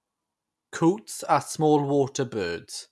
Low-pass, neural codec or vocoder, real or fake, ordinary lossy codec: none; none; real; none